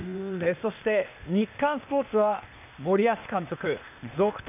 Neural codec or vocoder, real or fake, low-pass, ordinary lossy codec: codec, 16 kHz, 0.8 kbps, ZipCodec; fake; 3.6 kHz; none